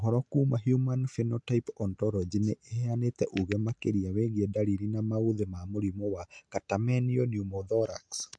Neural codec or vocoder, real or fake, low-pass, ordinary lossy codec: none; real; 9.9 kHz; MP3, 64 kbps